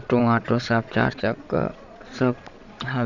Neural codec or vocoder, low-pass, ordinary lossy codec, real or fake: vocoder, 22.05 kHz, 80 mel bands, Vocos; 7.2 kHz; none; fake